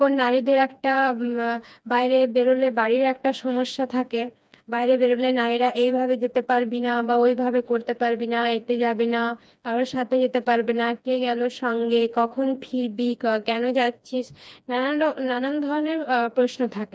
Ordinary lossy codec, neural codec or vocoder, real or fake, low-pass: none; codec, 16 kHz, 2 kbps, FreqCodec, smaller model; fake; none